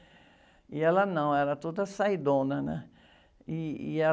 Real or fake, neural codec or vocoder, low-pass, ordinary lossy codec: real; none; none; none